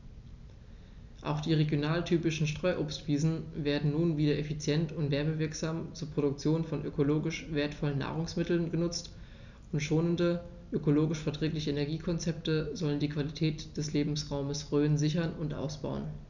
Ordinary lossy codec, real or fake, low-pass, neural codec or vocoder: none; real; 7.2 kHz; none